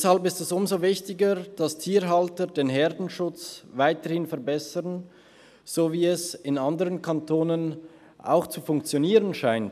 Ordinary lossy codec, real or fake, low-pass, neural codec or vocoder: none; real; 14.4 kHz; none